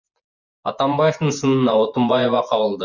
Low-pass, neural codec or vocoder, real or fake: 7.2 kHz; vocoder, 24 kHz, 100 mel bands, Vocos; fake